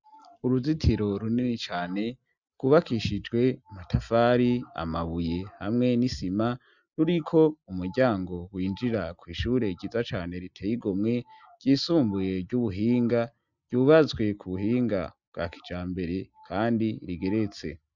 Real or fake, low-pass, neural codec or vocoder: real; 7.2 kHz; none